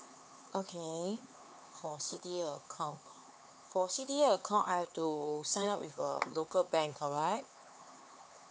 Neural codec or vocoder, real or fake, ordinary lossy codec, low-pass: codec, 16 kHz, 4 kbps, X-Codec, HuBERT features, trained on LibriSpeech; fake; none; none